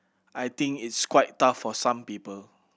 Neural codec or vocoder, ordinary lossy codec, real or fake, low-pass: none; none; real; none